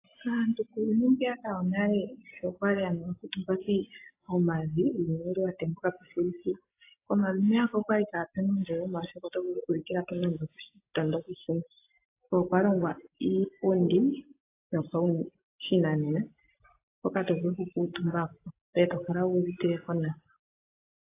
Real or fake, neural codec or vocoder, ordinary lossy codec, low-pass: real; none; AAC, 24 kbps; 3.6 kHz